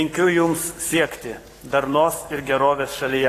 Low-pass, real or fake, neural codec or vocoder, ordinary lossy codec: 14.4 kHz; fake; codec, 44.1 kHz, 7.8 kbps, Pupu-Codec; AAC, 48 kbps